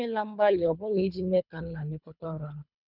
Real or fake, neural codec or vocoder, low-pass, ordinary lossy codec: fake; codec, 24 kHz, 3 kbps, HILCodec; 5.4 kHz; none